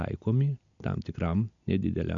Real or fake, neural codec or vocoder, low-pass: real; none; 7.2 kHz